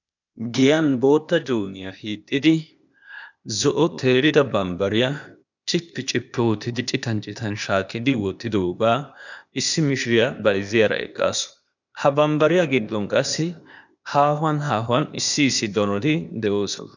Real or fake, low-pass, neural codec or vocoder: fake; 7.2 kHz; codec, 16 kHz, 0.8 kbps, ZipCodec